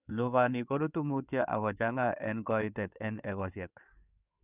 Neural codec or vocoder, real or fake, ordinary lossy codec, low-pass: codec, 16 kHz in and 24 kHz out, 2.2 kbps, FireRedTTS-2 codec; fake; none; 3.6 kHz